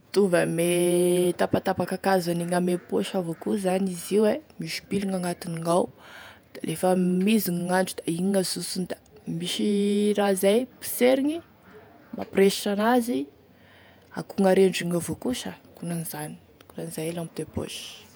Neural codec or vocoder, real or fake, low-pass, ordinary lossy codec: vocoder, 48 kHz, 128 mel bands, Vocos; fake; none; none